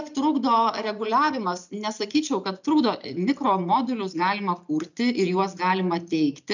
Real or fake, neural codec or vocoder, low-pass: real; none; 7.2 kHz